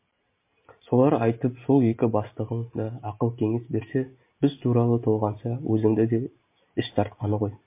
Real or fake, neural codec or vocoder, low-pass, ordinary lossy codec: real; none; 3.6 kHz; MP3, 24 kbps